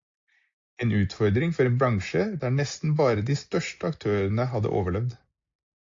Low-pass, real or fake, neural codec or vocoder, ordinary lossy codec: 7.2 kHz; real; none; AAC, 48 kbps